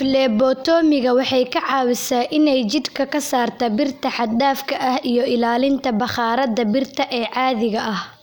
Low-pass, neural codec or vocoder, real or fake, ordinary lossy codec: none; none; real; none